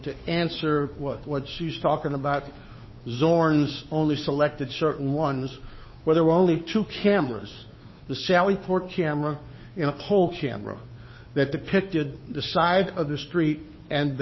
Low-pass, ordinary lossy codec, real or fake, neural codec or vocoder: 7.2 kHz; MP3, 24 kbps; fake; codec, 16 kHz, 2 kbps, FunCodec, trained on Chinese and English, 25 frames a second